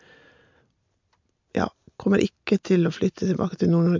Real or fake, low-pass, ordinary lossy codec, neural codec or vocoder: real; 7.2 kHz; MP3, 64 kbps; none